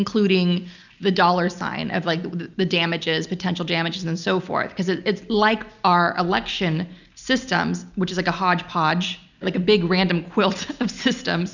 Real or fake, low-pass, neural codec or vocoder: real; 7.2 kHz; none